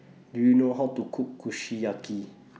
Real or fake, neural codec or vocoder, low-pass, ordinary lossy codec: real; none; none; none